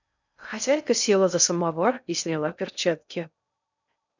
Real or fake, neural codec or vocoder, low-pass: fake; codec, 16 kHz in and 24 kHz out, 0.6 kbps, FocalCodec, streaming, 4096 codes; 7.2 kHz